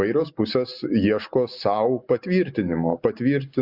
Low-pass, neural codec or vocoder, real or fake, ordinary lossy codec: 5.4 kHz; none; real; Opus, 64 kbps